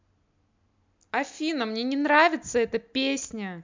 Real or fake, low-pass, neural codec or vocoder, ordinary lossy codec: real; 7.2 kHz; none; MP3, 64 kbps